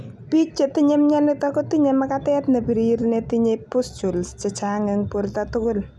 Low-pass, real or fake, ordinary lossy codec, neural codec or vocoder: none; real; none; none